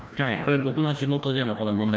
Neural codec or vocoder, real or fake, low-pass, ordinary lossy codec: codec, 16 kHz, 1 kbps, FreqCodec, larger model; fake; none; none